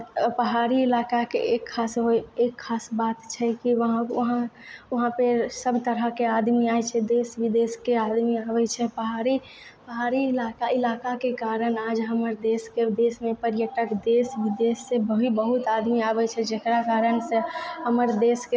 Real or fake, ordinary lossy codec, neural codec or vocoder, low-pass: real; none; none; none